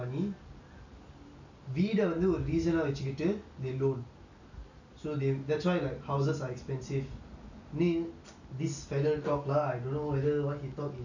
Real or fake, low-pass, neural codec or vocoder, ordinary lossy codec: real; 7.2 kHz; none; none